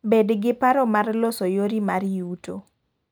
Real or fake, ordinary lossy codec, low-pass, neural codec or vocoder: real; none; none; none